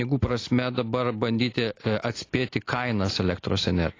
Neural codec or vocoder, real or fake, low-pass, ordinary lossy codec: none; real; 7.2 kHz; AAC, 32 kbps